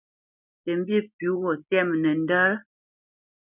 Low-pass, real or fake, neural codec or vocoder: 3.6 kHz; real; none